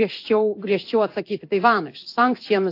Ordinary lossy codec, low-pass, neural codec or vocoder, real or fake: AAC, 32 kbps; 5.4 kHz; codec, 16 kHz in and 24 kHz out, 1 kbps, XY-Tokenizer; fake